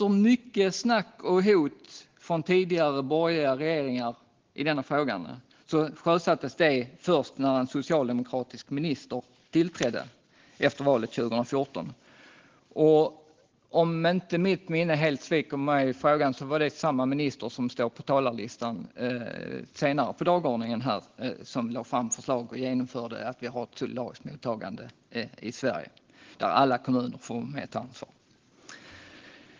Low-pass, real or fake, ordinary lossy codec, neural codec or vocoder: 7.2 kHz; real; Opus, 16 kbps; none